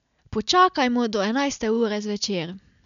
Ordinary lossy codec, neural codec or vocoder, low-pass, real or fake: none; none; 7.2 kHz; real